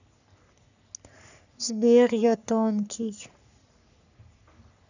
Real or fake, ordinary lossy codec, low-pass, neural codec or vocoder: fake; none; 7.2 kHz; codec, 44.1 kHz, 3.4 kbps, Pupu-Codec